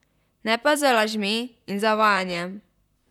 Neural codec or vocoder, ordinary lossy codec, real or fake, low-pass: vocoder, 44.1 kHz, 128 mel bands, Pupu-Vocoder; none; fake; 19.8 kHz